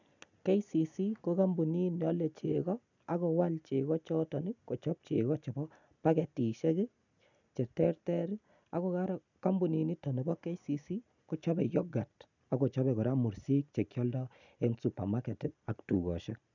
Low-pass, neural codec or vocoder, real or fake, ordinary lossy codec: 7.2 kHz; none; real; none